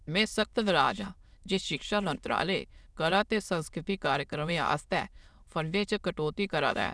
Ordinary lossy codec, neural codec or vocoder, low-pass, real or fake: none; autoencoder, 22.05 kHz, a latent of 192 numbers a frame, VITS, trained on many speakers; none; fake